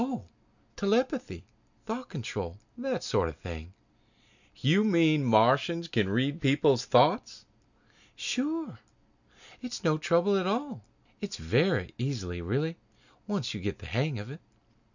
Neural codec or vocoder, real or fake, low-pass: none; real; 7.2 kHz